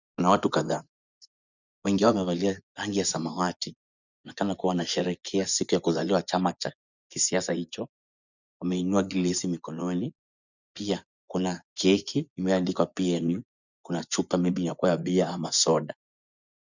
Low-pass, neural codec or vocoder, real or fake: 7.2 kHz; vocoder, 24 kHz, 100 mel bands, Vocos; fake